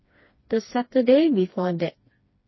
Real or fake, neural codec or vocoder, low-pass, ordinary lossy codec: fake; codec, 16 kHz, 2 kbps, FreqCodec, smaller model; 7.2 kHz; MP3, 24 kbps